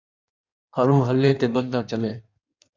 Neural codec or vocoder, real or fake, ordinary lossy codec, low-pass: codec, 16 kHz in and 24 kHz out, 1.1 kbps, FireRedTTS-2 codec; fake; MP3, 64 kbps; 7.2 kHz